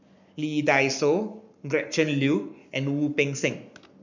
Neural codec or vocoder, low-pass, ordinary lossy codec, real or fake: codec, 44.1 kHz, 7.8 kbps, Pupu-Codec; 7.2 kHz; none; fake